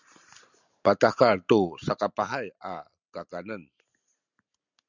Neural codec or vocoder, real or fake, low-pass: none; real; 7.2 kHz